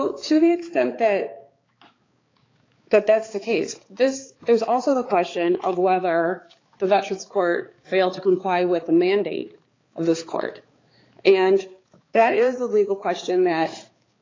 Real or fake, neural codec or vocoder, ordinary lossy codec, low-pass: fake; codec, 16 kHz, 4 kbps, X-Codec, HuBERT features, trained on balanced general audio; AAC, 32 kbps; 7.2 kHz